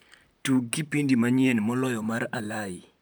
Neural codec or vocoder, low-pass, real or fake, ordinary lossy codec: vocoder, 44.1 kHz, 128 mel bands, Pupu-Vocoder; none; fake; none